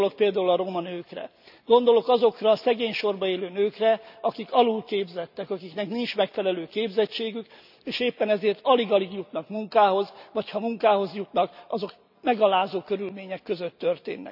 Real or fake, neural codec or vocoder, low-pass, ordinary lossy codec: real; none; 5.4 kHz; none